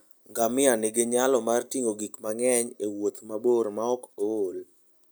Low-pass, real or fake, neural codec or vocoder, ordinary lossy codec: none; real; none; none